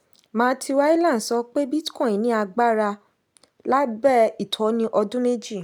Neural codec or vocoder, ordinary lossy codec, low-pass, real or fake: none; none; none; real